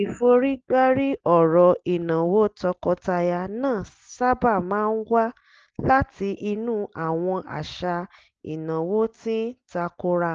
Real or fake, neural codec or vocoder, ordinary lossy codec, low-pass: real; none; Opus, 16 kbps; 7.2 kHz